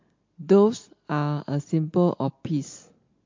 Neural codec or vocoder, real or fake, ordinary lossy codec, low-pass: none; real; MP3, 48 kbps; 7.2 kHz